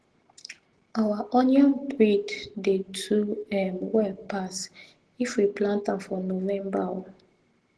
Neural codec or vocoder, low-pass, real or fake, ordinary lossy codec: none; 10.8 kHz; real; Opus, 16 kbps